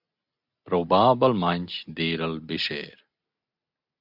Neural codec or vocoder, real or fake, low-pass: none; real; 5.4 kHz